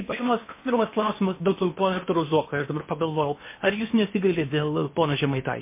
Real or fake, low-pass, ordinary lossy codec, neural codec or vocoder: fake; 3.6 kHz; MP3, 24 kbps; codec, 16 kHz in and 24 kHz out, 0.8 kbps, FocalCodec, streaming, 65536 codes